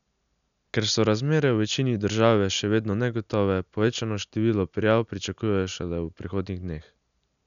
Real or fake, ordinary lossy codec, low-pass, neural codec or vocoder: real; none; 7.2 kHz; none